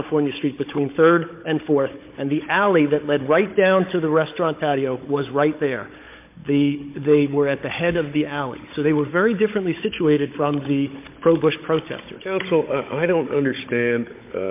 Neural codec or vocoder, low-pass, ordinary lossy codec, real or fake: codec, 16 kHz, 16 kbps, FunCodec, trained on Chinese and English, 50 frames a second; 3.6 kHz; MP3, 24 kbps; fake